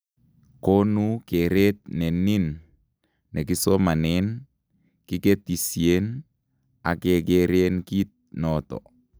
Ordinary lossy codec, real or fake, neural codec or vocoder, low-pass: none; real; none; none